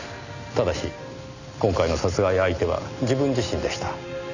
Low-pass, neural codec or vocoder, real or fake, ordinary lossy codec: 7.2 kHz; none; real; none